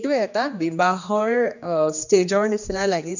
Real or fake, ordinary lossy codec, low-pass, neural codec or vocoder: fake; none; 7.2 kHz; codec, 16 kHz, 2 kbps, X-Codec, HuBERT features, trained on general audio